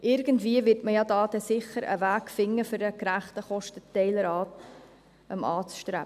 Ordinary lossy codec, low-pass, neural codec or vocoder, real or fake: none; 14.4 kHz; none; real